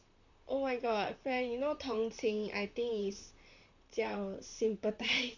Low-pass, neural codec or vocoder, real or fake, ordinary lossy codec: 7.2 kHz; vocoder, 44.1 kHz, 128 mel bands, Pupu-Vocoder; fake; none